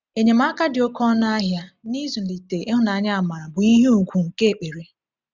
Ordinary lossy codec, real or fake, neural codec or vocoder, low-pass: none; real; none; 7.2 kHz